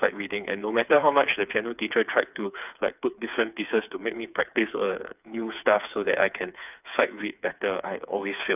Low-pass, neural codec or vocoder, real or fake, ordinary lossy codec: 3.6 kHz; codec, 16 kHz, 4 kbps, FreqCodec, smaller model; fake; none